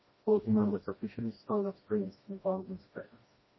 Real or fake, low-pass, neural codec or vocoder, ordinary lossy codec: fake; 7.2 kHz; codec, 16 kHz, 1 kbps, FreqCodec, smaller model; MP3, 24 kbps